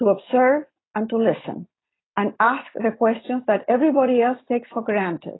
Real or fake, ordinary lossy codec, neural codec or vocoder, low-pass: real; AAC, 16 kbps; none; 7.2 kHz